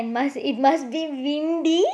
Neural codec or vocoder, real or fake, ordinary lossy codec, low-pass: none; real; none; none